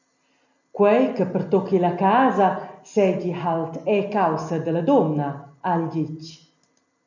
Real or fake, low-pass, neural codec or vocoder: real; 7.2 kHz; none